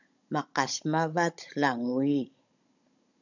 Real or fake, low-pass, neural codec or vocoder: fake; 7.2 kHz; codec, 16 kHz, 16 kbps, FunCodec, trained on Chinese and English, 50 frames a second